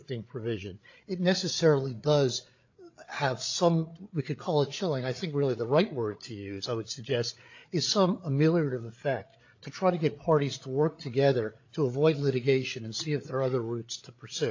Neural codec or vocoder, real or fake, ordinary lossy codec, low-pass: codec, 16 kHz, 8 kbps, FreqCodec, larger model; fake; AAC, 48 kbps; 7.2 kHz